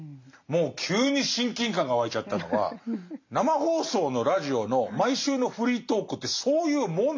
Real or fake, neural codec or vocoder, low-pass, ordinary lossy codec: real; none; 7.2 kHz; MP3, 48 kbps